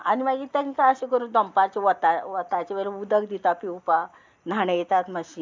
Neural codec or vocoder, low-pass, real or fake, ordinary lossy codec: none; 7.2 kHz; real; MP3, 64 kbps